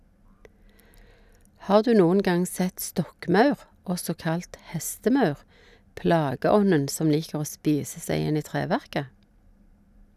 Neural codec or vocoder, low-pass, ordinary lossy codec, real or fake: none; 14.4 kHz; none; real